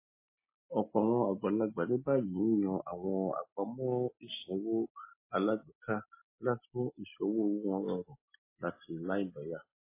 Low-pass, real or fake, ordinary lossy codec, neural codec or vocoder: 3.6 kHz; real; MP3, 16 kbps; none